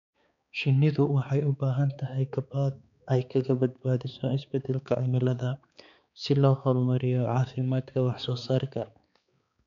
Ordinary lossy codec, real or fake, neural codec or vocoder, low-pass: none; fake; codec, 16 kHz, 4 kbps, X-Codec, HuBERT features, trained on balanced general audio; 7.2 kHz